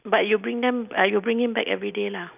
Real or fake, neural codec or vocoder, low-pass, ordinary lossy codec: real; none; 3.6 kHz; none